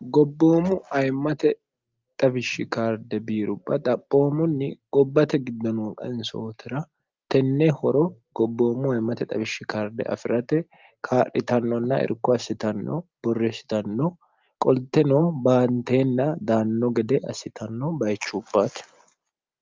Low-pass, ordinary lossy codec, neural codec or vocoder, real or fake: 7.2 kHz; Opus, 24 kbps; none; real